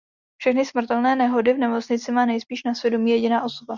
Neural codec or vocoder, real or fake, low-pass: none; real; 7.2 kHz